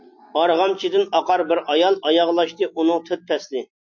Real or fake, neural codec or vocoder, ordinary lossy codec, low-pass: real; none; MP3, 48 kbps; 7.2 kHz